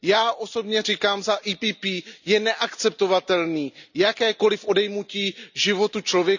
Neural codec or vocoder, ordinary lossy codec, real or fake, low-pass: none; none; real; 7.2 kHz